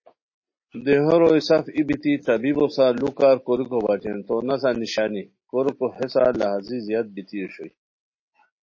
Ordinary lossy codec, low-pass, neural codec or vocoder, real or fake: MP3, 32 kbps; 7.2 kHz; none; real